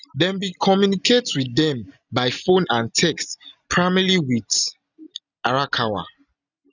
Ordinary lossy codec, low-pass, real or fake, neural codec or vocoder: none; 7.2 kHz; real; none